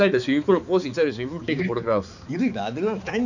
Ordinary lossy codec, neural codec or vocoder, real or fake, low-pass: none; codec, 16 kHz, 4 kbps, X-Codec, HuBERT features, trained on balanced general audio; fake; 7.2 kHz